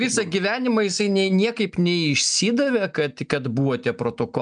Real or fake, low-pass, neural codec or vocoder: real; 9.9 kHz; none